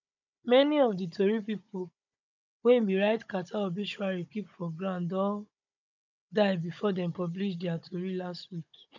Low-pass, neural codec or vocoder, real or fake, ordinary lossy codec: 7.2 kHz; codec, 16 kHz, 16 kbps, FunCodec, trained on Chinese and English, 50 frames a second; fake; none